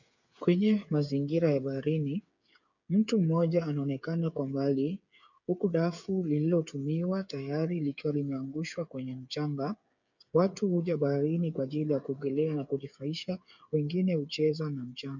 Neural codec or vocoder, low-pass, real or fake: codec, 16 kHz, 8 kbps, FreqCodec, smaller model; 7.2 kHz; fake